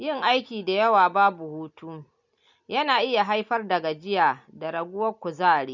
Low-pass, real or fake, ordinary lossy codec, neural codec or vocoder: 7.2 kHz; real; none; none